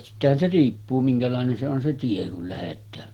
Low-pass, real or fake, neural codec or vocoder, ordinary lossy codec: 19.8 kHz; real; none; Opus, 16 kbps